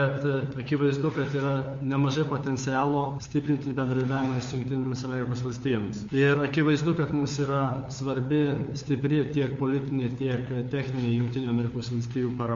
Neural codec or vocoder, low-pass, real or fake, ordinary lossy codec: codec, 16 kHz, 4 kbps, FunCodec, trained on LibriTTS, 50 frames a second; 7.2 kHz; fake; MP3, 48 kbps